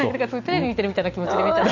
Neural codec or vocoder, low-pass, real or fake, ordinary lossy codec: none; 7.2 kHz; real; none